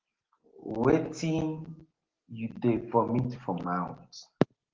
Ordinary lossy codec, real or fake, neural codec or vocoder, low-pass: Opus, 16 kbps; real; none; 7.2 kHz